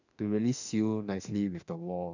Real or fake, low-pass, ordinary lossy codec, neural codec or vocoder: fake; 7.2 kHz; none; autoencoder, 48 kHz, 32 numbers a frame, DAC-VAE, trained on Japanese speech